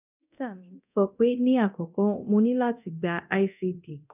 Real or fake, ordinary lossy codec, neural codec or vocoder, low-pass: fake; none; codec, 24 kHz, 0.9 kbps, DualCodec; 3.6 kHz